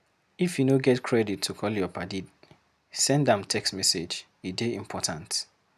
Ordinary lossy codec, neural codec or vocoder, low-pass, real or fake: none; none; 14.4 kHz; real